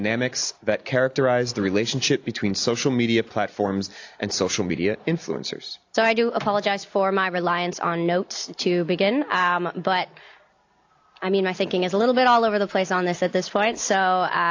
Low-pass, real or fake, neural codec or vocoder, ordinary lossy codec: 7.2 kHz; real; none; AAC, 48 kbps